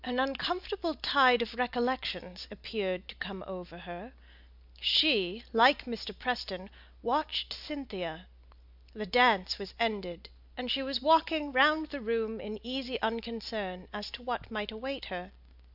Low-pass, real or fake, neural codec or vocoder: 5.4 kHz; real; none